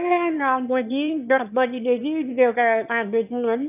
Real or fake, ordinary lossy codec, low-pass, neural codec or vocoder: fake; none; 3.6 kHz; autoencoder, 22.05 kHz, a latent of 192 numbers a frame, VITS, trained on one speaker